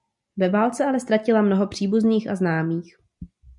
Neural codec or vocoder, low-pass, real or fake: none; 10.8 kHz; real